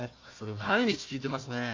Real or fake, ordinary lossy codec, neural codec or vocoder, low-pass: fake; AAC, 48 kbps; codec, 16 kHz, 1 kbps, FunCodec, trained on Chinese and English, 50 frames a second; 7.2 kHz